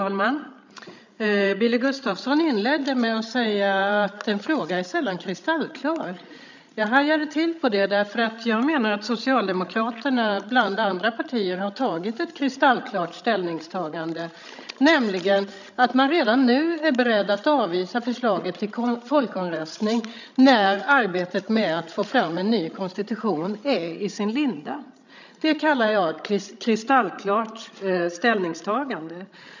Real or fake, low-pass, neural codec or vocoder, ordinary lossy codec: fake; 7.2 kHz; codec, 16 kHz, 16 kbps, FreqCodec, larger model; none